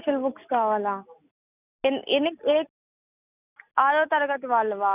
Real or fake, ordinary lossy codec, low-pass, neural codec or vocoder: real; none; 3.6 kHz; none